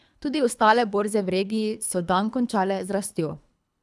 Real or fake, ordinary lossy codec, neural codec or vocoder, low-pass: fake; none; codec, 24 kHz, 3 kbps, HILCodec; none